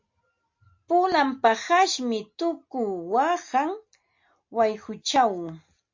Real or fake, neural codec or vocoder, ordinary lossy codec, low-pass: real; none; MP3, 48 kbps; 7.2 kHz